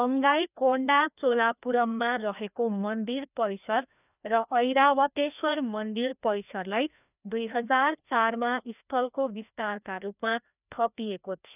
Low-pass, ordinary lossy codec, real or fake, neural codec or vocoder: 3.6 kHz; none; fake; codec, 16 kHz, 1 kbps, FreqCodec, larger model